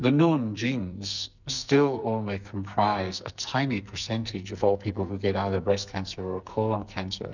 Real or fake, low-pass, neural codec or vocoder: fake; 7.2 kHz; codec, 44.1 kHz, 2.6 kbps, SNAC